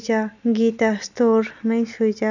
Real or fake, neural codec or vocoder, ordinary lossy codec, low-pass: real; none; none; 7.2 kHz